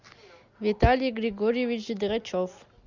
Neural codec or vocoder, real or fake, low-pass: none; real; 7.2 kHz